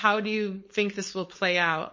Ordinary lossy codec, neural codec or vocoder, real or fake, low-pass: MP3, 32 kbps; codec, 16 kHz, 4.8 kbps, FACodec; fake; 7.2 kHz